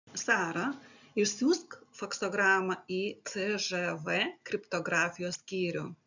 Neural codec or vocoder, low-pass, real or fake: none; 7.2 kHz; real